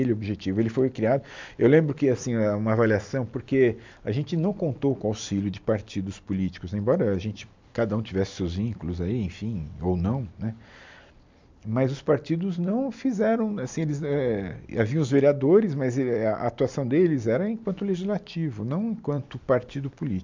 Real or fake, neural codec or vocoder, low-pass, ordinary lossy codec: real; none; 7.2 kHz; MP3, 64 kbps